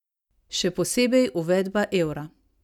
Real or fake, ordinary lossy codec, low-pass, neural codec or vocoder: real; none; 19.8 kHz; none